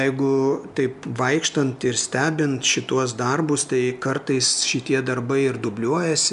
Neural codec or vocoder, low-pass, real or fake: none; 10.8 kHz; real